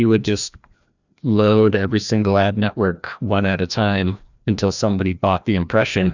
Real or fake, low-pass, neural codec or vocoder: fake; 7.2 kHz; codec, 16 kHz, 1 kbps, FreqCodec, larger model